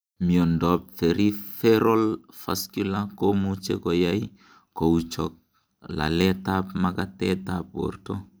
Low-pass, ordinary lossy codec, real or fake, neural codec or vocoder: none; none; real; none